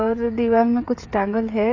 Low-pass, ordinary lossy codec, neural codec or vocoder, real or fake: 7.2 kHz; AAC, 48 kbps; codec, 16 kHz, 16 kbps, FreqCodec, smaller model; fake